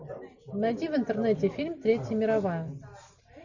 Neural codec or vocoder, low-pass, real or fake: none; 7.2 kHz; real